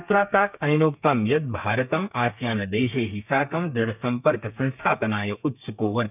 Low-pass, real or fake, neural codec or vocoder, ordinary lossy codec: 3.6 kHz; fake; codec, 32 kHz, 1.9 kbps, SNAC; none